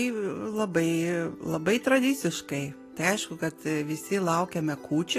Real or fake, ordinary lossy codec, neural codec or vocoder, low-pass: real; AAC, 48 kbps; none; 14.4 kHz